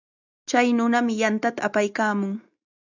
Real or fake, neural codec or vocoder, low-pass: real; none; 7.2 kHz